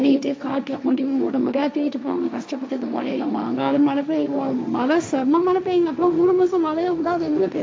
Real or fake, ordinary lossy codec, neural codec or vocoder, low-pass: fake; none; codec, 16 kHz, 1.1 kbps, Voila-Tokenizer; none